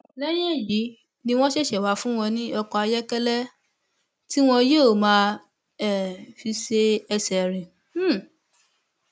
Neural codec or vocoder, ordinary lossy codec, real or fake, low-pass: none; none; real; none